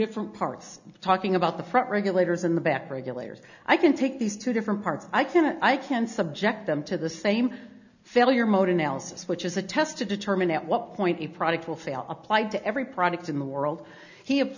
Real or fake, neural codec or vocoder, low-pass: real; none; 7.2 kHz